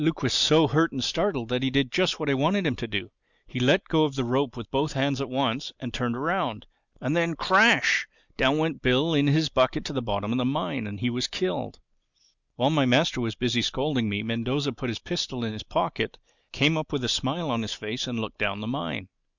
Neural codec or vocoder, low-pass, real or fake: none; 7.2 kHz; real